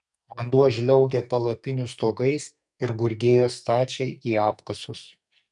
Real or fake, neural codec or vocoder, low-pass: fake; codec, 44.1 kHz, 2.6 kbps, SNAC; 10.8 kHz